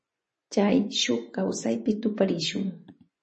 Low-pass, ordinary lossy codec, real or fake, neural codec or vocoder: 10.8 kHz; MP3, 32 kbps; fake; vocoder, 24 kHz, 100 mel bands, Vocos